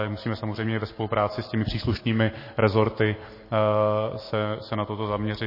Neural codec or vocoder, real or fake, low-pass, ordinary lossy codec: none; real; 5.4 kHz; MP3, 24 kbps